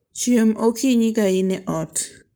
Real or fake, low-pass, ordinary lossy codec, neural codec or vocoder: fake; none; none; codec, 44.1 kHz, 7.8 kbps, Pupu-Codec